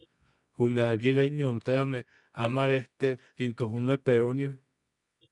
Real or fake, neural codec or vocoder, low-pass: fake; codec, 24 kHz, 0.9 kbps, WavTokenizer, medium music audio release; 10.8 kHz